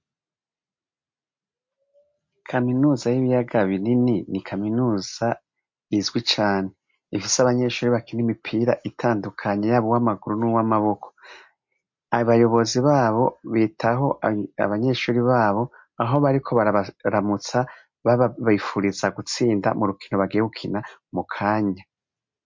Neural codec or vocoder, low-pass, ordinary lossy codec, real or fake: none; 7.2 kHz; MP3, 48 kbps; real